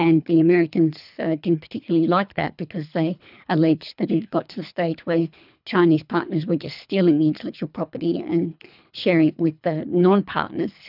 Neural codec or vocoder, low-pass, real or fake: codec, 24 kHz, 3 kbps, HILCodec; 5.4 kHz; fake